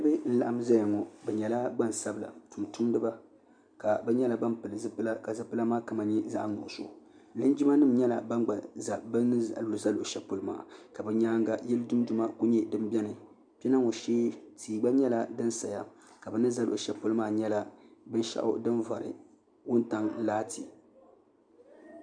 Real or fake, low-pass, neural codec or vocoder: real; 9.9 kHz; none